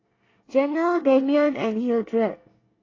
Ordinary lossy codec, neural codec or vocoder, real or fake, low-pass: AAC, 32 kbps; codec, 24 kHz, 1 kbps, SNAC; fake; 7.2 kHz